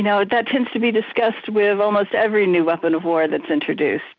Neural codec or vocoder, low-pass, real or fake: none; 7.2 kHz; real